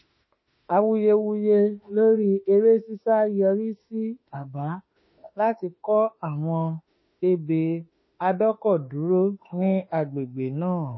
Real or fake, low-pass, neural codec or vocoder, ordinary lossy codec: fake; 7.2 kHz; autoencoder, 48 kHz, 32 numbers a frame, DAC-VAE, trained on Japanese speech; MP3, 24 kbps